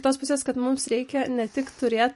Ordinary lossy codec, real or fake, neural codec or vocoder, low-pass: MP3, 48 kbps; fake; vocoder, 44.1 kHz, 128 mel bands every 512 samples, BigVGAN v2; 14.4 kHz